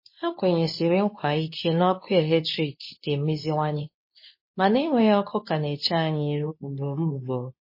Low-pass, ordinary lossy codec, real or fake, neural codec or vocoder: 5.4 kHz; MP3, 24 kbps; fake; codec, 24 kHz, 0.9 kbps, WavTokenizer, medium speech release version 2